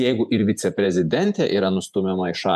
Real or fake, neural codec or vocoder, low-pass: fake; vocoder, 48 kHz, 128 mel bands, Vocos; 14.4 kHz